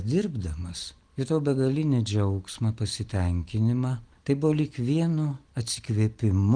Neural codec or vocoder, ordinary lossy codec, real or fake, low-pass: none; Opus, 24 kbps; real; 9.9 kHz